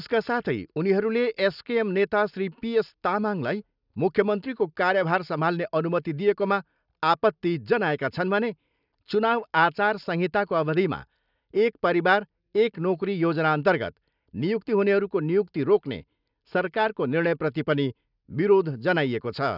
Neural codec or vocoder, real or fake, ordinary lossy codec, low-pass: none; real; none; 5.4 kHz